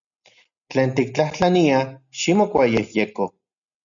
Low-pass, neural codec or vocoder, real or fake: 7.2 kHz; none; real